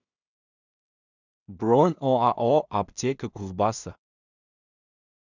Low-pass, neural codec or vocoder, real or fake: 7.2 kHz; codec, 16 kHz in and 24 kHz out, 0.4 kbps, LongCat-Audio-Codec, two codebook decoder; fake